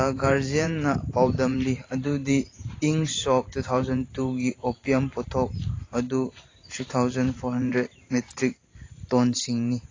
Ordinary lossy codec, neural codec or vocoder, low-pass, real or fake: AAC, 32 kbps; vocoder, 44.1 kHz, 128 mel bands every 512 samples, BigVGAN v2; 7.2 kHz; fake